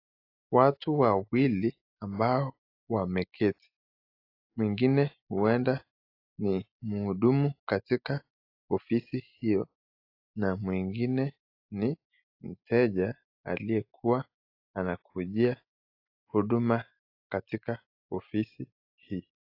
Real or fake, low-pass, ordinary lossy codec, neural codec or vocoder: real; 5.4 kHz; AAC, 32 kbps; none